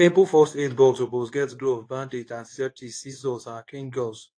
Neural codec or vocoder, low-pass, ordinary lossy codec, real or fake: codec, 24 kHz, 0.9 kbps, WavTokenizer, medium speech release version 2; 9.9 kHz; AAC, 48 kbps; fake